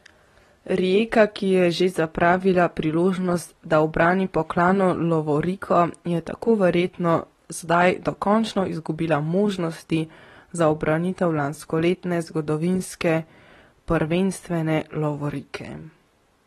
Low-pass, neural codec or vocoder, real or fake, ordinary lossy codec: 19.8 kHz; none; real; AAC, 32 kbps